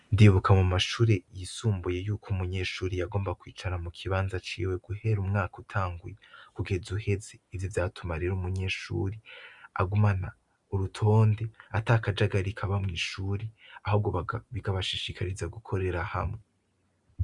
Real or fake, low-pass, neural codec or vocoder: real; 10.8 kHz; none